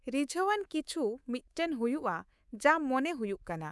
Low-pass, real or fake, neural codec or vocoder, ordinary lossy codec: none; fake; codec, 24 kHz, 3.1 kbps, DualCodec; none